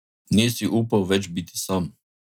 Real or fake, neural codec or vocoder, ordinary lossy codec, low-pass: real; none; none; 19.8 kHz